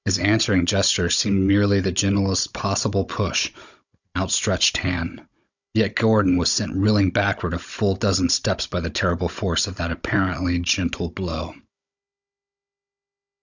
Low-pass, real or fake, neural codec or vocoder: 7.2 kHz; fake; codec, 16 kHz, 16 kbps, FunCodec, trained on Chinese and English, 50 frames a second